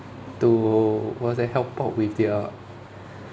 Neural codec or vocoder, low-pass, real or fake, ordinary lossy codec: none; none; real; none